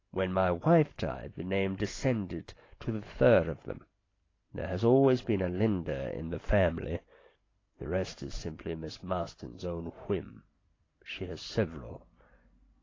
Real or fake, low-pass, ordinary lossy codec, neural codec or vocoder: real; 7.2 kHz; AAC, 32 kbps; none